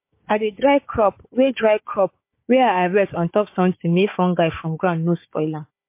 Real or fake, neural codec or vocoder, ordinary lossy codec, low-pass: fake; codec, 16 kHz, 4 kbps, FunCodec, trained on Chinese and English, 50 frames a second; MP3, 24 kbps; 3.6 kHz